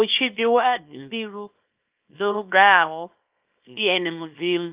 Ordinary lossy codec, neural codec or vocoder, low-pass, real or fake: Opus, 24 kbps; codec, 24 kHz, 0.9 kbps, WavTokenizer, small release; 3.6 kHz; fake